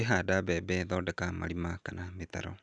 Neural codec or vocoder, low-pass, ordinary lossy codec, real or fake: none; 9.9 kHz; none; real